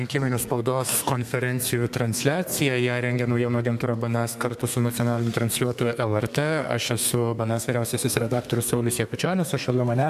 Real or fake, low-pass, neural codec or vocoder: fake; 14.4 kHz; codec, 32 kHz, 1.9 kbps, SNAC